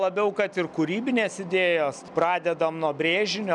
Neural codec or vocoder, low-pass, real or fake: none; 10.8 kHz; real